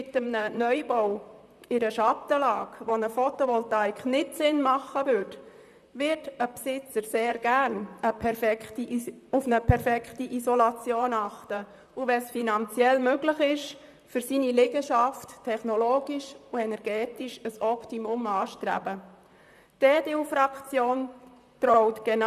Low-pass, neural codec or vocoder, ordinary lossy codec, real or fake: 14.4 kHz; vocoder, 44.1 kHz, 128 mel bands, Pupu-Vocoder; none; fake